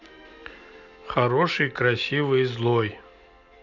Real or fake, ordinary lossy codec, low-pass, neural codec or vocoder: real; none; 7.2 kHz; none